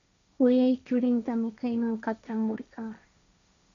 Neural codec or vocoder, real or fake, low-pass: codec, 16 kHz, 1.1 kbps, Voila-Tokenizer; fake; 7.2 kHz